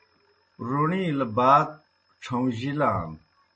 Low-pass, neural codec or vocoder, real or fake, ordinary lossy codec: 9.9 kHz; none; real; MP3, 32 kbps